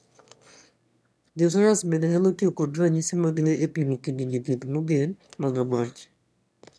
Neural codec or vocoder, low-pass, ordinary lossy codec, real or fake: autoencoder, 22.05 kHz, a latent of 192 numbers a frame, VITS, trained on one speaker; none; none; fake